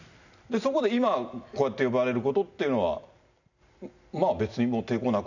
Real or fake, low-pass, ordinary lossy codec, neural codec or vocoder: real; 7.2 kHz; none; none